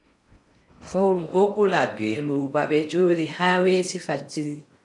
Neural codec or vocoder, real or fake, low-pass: codec, 16 kHz in and 24 kHz out, 0.6 kbps, FocalCodec, streaming, 4096 codes; fake; 10.8 kHz